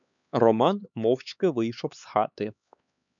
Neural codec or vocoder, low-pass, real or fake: codec, 16 kHz, 4 kbps, X-Codec, HuBERT features, trained on LibriSpeech; 7.2 kHz; fake